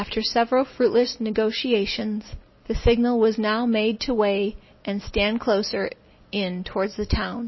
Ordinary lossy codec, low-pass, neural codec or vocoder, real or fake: MP3, 24 kbps; 7.2 kHz; none; real